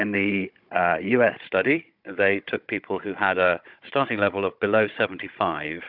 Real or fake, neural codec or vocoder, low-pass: fake; codec, 16 kHz, 16 kbps, FunCodec, trained on Chinese and English, 50 frames a second; 5.4 kHz